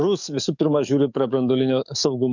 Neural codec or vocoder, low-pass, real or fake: codec, 44.1 kHz, 7.8 kbps, DAC; 7.2 kHz; fake